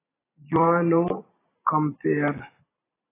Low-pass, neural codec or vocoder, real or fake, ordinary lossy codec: 3.6 kHz; vocoder, 44.1 kHz, 128 mel bands every 256 samples, BigVGAN v2; fake; AAC, 16 kbps